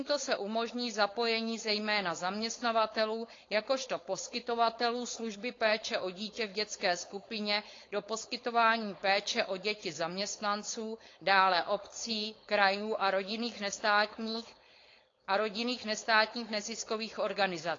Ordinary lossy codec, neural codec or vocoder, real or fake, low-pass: AAC, 32 kbps; codec, 16 kHz, 4.8 kbps, FACodec; fake; 7.2 kHz